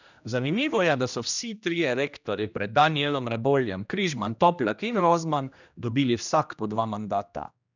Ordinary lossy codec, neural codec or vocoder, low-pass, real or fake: none; codec, 16 kHz, 1 kbps, X-Codec, HuBERT features, trained on general audio; 7.2 kHz; fake